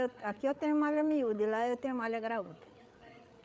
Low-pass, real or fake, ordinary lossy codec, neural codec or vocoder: none; fake; none; codec, 16 kHz, 8 kbps, FreqCodec, larger model